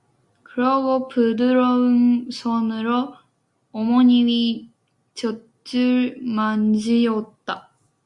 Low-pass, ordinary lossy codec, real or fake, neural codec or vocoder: 10.8 kHz; Opus, 64 kbps; real; none